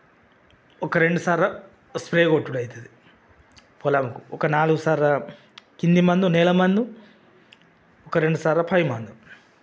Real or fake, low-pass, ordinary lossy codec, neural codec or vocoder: real; none; none; none